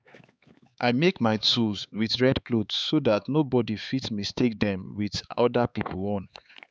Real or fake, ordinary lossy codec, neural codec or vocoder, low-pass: fake; none; codec, 16 kHz, 4 kbps, X-Codec, HuBERT features, trained on LibriSpeech; none